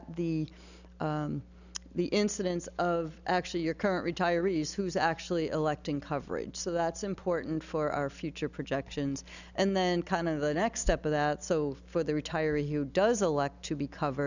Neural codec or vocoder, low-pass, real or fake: none; 7.2 kHz; real